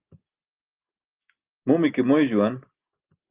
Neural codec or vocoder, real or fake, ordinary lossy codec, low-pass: none; real; Opus, 32 kbps; 3.6 kHz